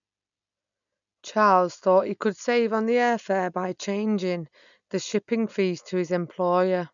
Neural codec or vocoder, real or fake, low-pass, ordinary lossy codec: none; real; 7.2 kHz; none